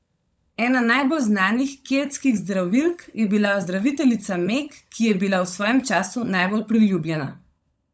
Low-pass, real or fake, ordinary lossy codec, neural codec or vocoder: none; fake; none; codec, 16 kHz, 16 kbps, FunCodec, trained on LibriTTS, 50 frames a second